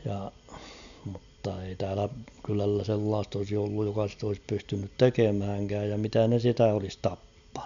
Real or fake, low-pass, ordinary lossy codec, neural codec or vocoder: real; 7.2 kHz; MP3, 96 kbps; none